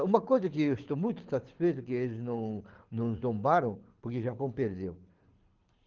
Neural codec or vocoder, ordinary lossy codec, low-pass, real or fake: codec, 24 kHz, 6 kbps, HILCodec; Opus, 16 kbps; 7.2 kHz; fake